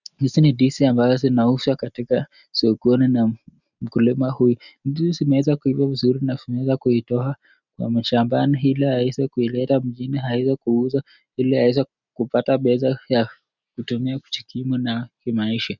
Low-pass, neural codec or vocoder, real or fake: 7.2 kHz; none; real